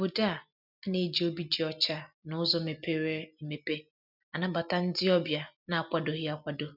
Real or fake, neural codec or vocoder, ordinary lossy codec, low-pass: real; none; none; 5.4 kHz